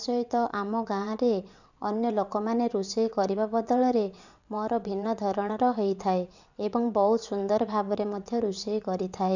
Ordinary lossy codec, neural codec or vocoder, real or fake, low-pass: none; vocoder, 22.05 kHz, 80 mel bands, WaveNeXt; fake; 7.2 kHz